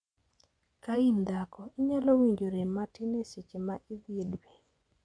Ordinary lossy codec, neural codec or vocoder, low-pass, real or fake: none; vocoder, 24 kHz, 100 mel bands, Vocos; 9.9 kHz; fake